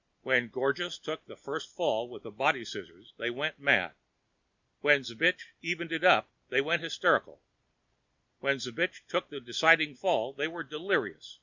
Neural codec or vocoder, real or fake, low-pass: none; real; 7.2 kHz